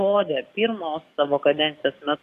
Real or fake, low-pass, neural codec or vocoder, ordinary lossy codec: fake; 14.4 kHz; codec, 44.1 kHz, 7.8 kbps, DAC; AAC, 64 kbps